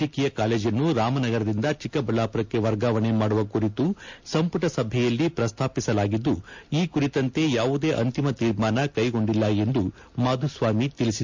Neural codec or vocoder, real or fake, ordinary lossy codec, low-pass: none; real; MP3, 64 kbps; 7.2 kHz